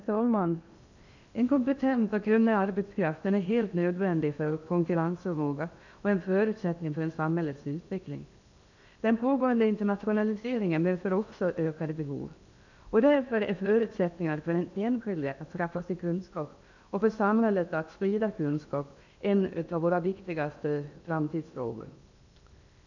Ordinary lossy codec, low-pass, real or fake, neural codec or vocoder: none; 7.2 kHz; fake; codec, 16 kHz in and 24 kHz out, 0.8 kbps, FocalCodec, streaming, 65536 codes